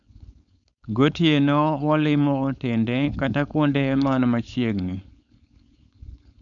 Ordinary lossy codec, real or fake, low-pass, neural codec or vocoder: none; fake; 7.2 kHz; codec, 16 kHz, 4.8 kbps, FACodec